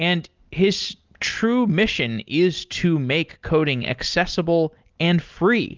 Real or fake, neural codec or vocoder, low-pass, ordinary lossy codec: real; none; 7.2 kHz; Opus, 24 kbps